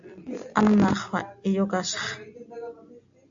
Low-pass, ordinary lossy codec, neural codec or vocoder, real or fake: 7.2 kHz; AAC, 64 kbps; none; real